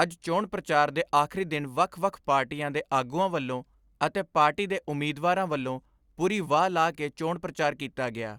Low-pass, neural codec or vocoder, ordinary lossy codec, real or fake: 19.8 kHz; none; Opus, 64 kbps; real